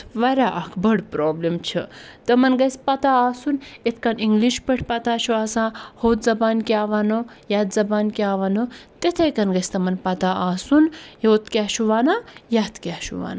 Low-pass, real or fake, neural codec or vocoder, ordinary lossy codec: none; real; none; none